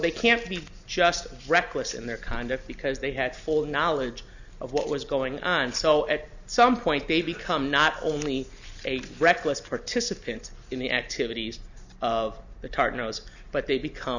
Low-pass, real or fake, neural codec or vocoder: 7.2 kHz; real; none